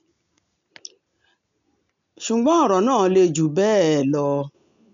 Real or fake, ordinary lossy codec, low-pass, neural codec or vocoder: real; MP3, 64 kbps; 7.2 kHz; none